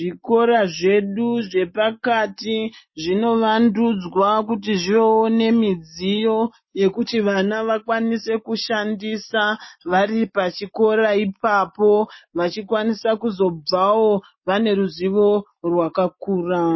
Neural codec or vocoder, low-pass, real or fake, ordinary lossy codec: none; 7.2 kHz; real; MP3, 24 kbps